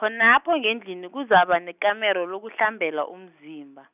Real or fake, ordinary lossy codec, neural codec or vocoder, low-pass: real; none; none; 3.6 kHz